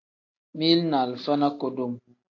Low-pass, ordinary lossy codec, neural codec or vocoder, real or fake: 7.2 kHz; AAC, 48 kbps; none; real